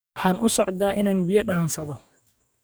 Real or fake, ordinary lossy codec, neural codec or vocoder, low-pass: fake; none; codec, 44.1 kHz, 2.6 kbps, DAC; none